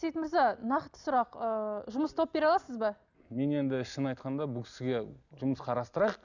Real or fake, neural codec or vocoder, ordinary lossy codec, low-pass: real; none; none; 7.2 kHz